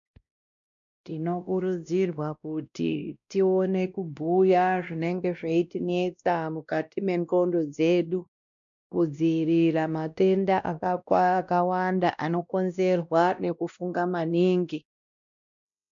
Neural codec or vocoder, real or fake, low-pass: codec, 16 kHz, 1 kbps, X-Codec, WavLM features, trained on Multilingual LibriSpeech; fake; 7.2 kHz